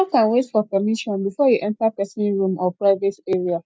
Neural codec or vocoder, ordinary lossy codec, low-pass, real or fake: none; none; none; real